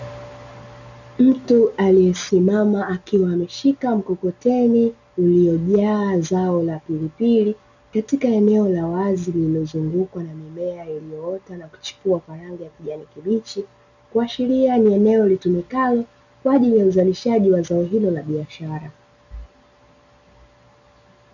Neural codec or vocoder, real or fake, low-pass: none; real; 7.2 kHz